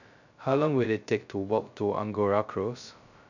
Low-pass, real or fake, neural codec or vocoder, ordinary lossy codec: 7.2 kHz; fake; codec, 16 kHz, 0.2 kbps, FocalCodec; none